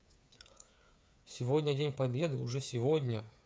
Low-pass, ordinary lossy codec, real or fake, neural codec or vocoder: none; none; fake; codec, 16 kHz, 8 kbps, FreqCodec, smaller model